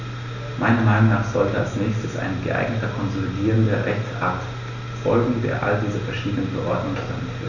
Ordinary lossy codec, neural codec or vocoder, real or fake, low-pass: none; none; real; 7.2 kHz